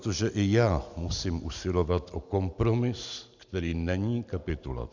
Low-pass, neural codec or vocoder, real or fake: 7.2 kHz; none; real